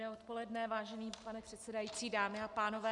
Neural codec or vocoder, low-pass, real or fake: none; 10.8 kHz; real